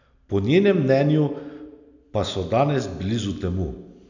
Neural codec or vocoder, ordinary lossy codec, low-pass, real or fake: none; none; 7.2 kHz; real